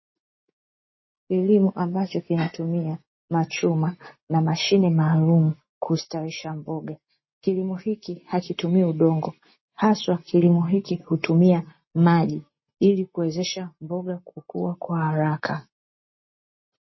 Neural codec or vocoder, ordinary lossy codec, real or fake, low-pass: none; MP3, 24 kbps; real; 7.2 kHz